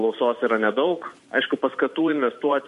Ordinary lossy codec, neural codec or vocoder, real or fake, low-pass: MP3, 48 kbps; vocoder, 44.1 kHz, 128 mel bands every 512 samples, BigVGAN v2; fake; 14.4 kHz